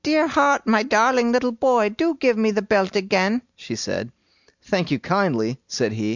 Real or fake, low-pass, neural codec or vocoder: real; 7.2 kHz; none